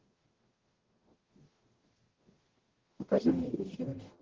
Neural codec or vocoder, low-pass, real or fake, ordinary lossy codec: codec, 44.1 kHz, 0.9 kbps, DAC; 7.2 kHz; fake; Opus, 16 kbps